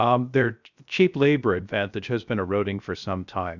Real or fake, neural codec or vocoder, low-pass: fake; codec, 16 kHz, 0.7 kbps, FocalCodec; 7.2 kHz